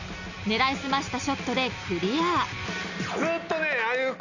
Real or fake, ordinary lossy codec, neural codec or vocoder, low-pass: real; none; none; 7.2 kHz